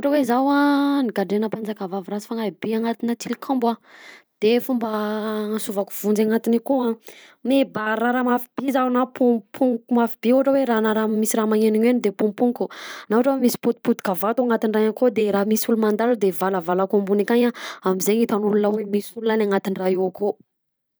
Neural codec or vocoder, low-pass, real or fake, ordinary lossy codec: vocoder, 44.1 kHz, 128 mel bands every 512 samples, BigVGAN v2; none; fake; none